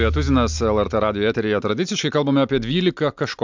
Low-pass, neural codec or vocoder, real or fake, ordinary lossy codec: 7.2 kHz; none; real; MP3, 64 kbps